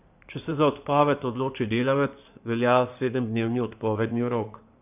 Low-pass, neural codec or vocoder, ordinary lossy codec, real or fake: 3.6 kHz; codec, 16 kHz in and 24 kHz out, 2.2 kbps, FireRedTTS-2 codec; none; fake